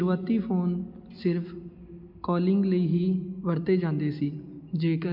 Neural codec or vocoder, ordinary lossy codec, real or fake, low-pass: none; MP3, 48 kbps; real; 5.4 kHz